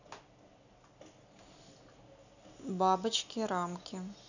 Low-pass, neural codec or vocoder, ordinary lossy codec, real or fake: 7.2 kHz; none; none; real